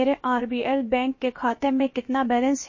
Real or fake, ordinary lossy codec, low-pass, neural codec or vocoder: fake; MP3, 32 kbps; 7.2 kHz; codec, 16 kHz, about 1 kbps, DyCAST, with the encoder's durations